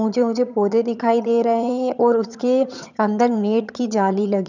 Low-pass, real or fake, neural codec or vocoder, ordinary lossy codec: 7.2 kHz; fake; vocoder, 22.05 kHz, 80 mel bands, HiFi-GAN; none